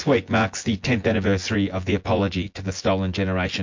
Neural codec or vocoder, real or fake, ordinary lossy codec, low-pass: vocoder, 24 kHz, 100 mel bands, Vocos; fake; MP3, 48 kbps; 7.2 kHz